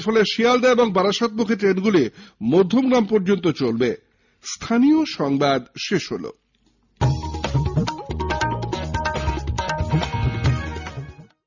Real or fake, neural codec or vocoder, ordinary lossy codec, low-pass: real; none; none; 7.2 kHz